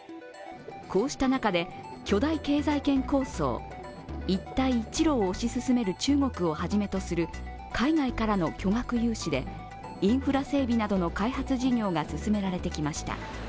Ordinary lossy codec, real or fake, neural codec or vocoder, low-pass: none; real; none; none